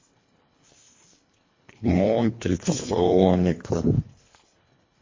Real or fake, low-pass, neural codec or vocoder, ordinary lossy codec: fake; 7.2 kHz; codec, 24 kHz, 1.5 kbps, HILCodec; MP3, 32 kbps